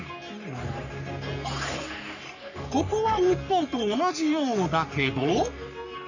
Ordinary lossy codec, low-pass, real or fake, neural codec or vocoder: none; 7.2 kHz; fake; codec, 44.1 kHz, 3.4 kbps, Pupu-Codec